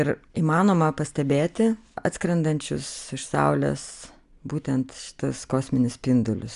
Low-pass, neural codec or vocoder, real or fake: 10.8 kHz; none; real